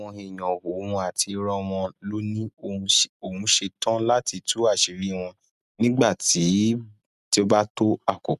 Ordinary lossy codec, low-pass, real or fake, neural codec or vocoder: none; 14.4 kHz; real; none